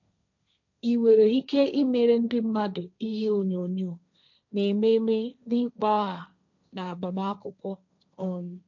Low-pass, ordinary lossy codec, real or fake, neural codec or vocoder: 7.2 kHz; none; fake; codec, 16 kHz, 1.1 kbps, Voila-Tokenizer